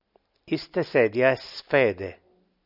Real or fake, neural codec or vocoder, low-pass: real; none; 5.4 kHz